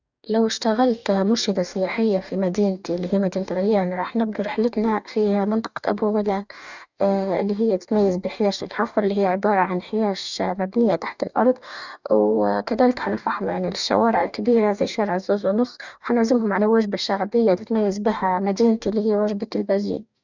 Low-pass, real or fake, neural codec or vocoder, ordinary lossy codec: 7.2 kHz; fake; codec, 44.1 kHz, 2.6 kbps, DAC; none